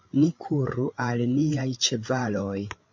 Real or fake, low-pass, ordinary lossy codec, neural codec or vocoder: fake; 7.2 kHz; MP3, 48 kbps; codec, 16 kHz, 8 kbps, FreqCodec, larger model